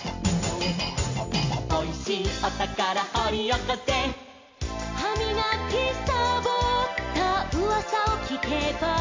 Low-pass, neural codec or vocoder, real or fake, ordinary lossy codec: 7.2 kHz; none; real; MP3, 48 kbps